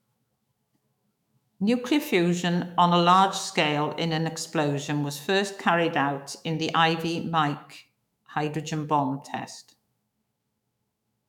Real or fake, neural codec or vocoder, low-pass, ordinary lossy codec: fake; autoencoder, 48 kHz, 128 numbers a frame, DAC-VAE, trained on Japanese speech; 19.8 kHz; none